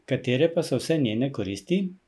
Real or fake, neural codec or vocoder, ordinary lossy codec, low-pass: real; none; none; none